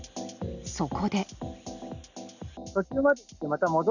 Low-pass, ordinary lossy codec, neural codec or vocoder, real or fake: 7.2 kHz; none; none; real